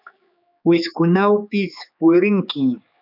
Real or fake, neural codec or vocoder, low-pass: fake; codec, 16 kHz, 4 kbps, X-Codec, HuBERT features, trained on general audio; 5.4 kHz